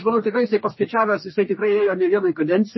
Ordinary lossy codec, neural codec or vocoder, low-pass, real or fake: MP3, 24 kbps; codec, 44.1 kHz, 2.6 kbps, DAC; 7.2 kHz; fake